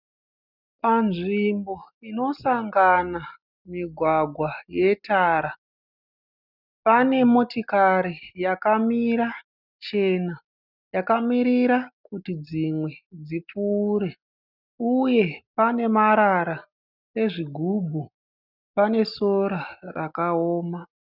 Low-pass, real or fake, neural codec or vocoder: 5.4 kHz; real; none